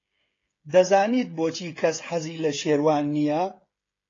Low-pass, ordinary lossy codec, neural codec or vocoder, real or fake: 7.2 kHz; AAC, 32 kbps; codec, 16 kHz, 16 kbps, FreqCodec, smaller model; fake